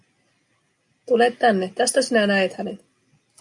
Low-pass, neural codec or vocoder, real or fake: 10.8 kHz; none; real